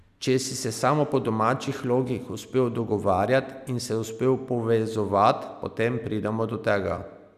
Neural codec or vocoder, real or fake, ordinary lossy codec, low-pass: none; real; none; 14.4 kHz